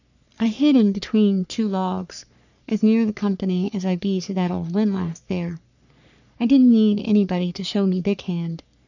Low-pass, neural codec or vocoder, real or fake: 7.2 kHz; codec, 44.1 kHz, 3.4 kbps, Pupu-Codec; fake